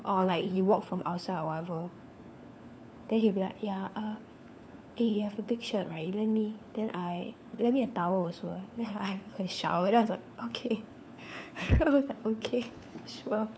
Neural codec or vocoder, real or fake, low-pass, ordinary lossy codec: codec, 16 kHz, 4 kbps, FunCodec, trained on LibriTTS, 50 frames a second; fake; none; none